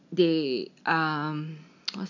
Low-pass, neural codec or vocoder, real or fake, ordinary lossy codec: 7.2 kHz; none; real; none